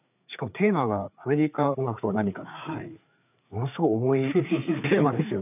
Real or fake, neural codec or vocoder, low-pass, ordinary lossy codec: fake; codec, 16 kHz, 4 kbps, FreqCodec, larger model; 3.6 kHz; none